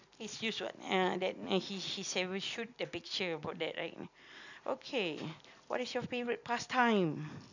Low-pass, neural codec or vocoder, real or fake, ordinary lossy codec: 7.2 kHz; none; real; none